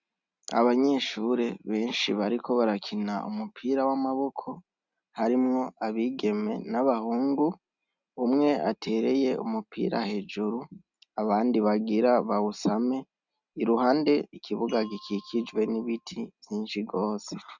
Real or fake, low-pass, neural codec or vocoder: real; 7.2 kHz; none